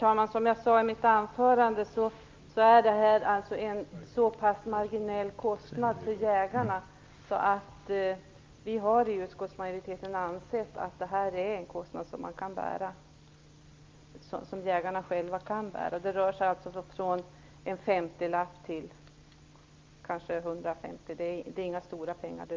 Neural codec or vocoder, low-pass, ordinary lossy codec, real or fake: none; 7.2 kHz; Opus, 32 kbps; real